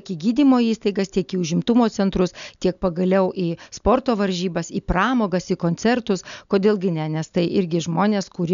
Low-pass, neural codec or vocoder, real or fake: 7.2 kHz; none; real